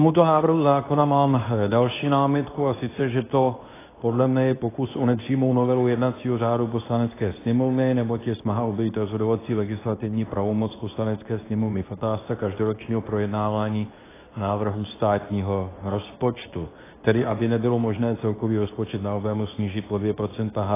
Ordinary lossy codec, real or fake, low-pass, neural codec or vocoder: AAC, 16 kbps; fake; 3.6 kHz; codec, 24 kHz, 0.9 kbps, WavTokenizer, medium speech release version 2